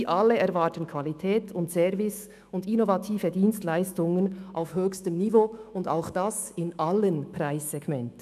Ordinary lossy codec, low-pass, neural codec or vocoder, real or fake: none; 14.4 kHz; autoencoder, 48 kHz, 128 numbers a frame, DAC-VAE, trained on Japanese speech; fake